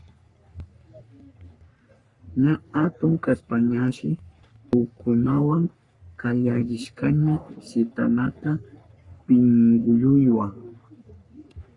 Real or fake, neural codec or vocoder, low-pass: fake; codec, 44.1 kHz, 3.4 kbps, Pupu-Codec; 10.8 kHz